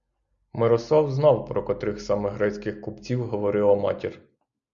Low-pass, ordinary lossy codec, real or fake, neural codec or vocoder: 7.2 kHz; Opus, 64 kbps; real; none